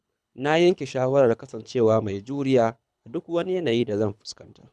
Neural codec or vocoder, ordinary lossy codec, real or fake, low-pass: codec, 24 kHz, 6 kbps, HILCodec; none; fake; none